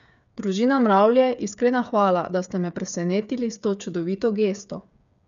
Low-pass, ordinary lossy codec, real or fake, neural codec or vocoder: 7.2 kHz; none; fake; codec, 16 kHz, 8 kbps, FreqCodec, smaller model